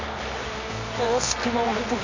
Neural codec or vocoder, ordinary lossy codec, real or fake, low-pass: codec, 24 kHz, 0.9 kbps, WavTokenizer, medium music audio release; MP3, 64 kbps; fake; 7.2 kHz